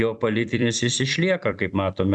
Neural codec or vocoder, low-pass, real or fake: vocoder, 44.1 kHz, 128 mel bands every 256 samples, BigVGAN v2; 10.8 kHz; fake